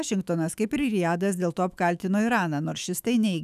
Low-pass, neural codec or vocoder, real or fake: 14.4 kHz; autoencoder, 48 kHz, 128 numbers a frame, DAC-VAE, trained on Japanese speech; fake